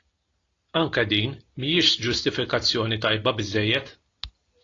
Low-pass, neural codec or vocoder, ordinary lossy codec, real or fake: 7.2 kHz; none; AAC, 32 kbps; real